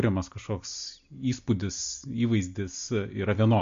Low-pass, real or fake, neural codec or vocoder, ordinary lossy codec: 7.2 kHz; real; none; MP3, 48 kbps